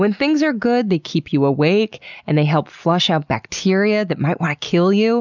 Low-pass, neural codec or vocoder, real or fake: 7.2 kHz; none; real